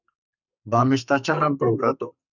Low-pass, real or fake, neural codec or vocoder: 7.2 kHz; fake; codec, 32 kHz, 1.9 kbps, SNAC